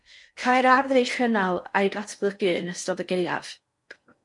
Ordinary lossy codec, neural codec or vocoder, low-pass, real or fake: MP3, 64 kbps; codec, 16 kHz in and 24 kHz out, 0.6 kbps, FocalCodec, streaming, 4096 codes; 10.8 kHz; fake